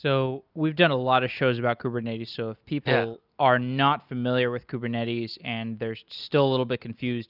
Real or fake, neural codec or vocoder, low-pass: real; none; 5.4 kHz